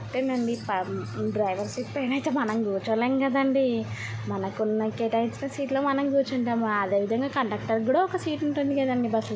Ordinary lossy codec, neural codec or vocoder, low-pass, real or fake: none; none; none; real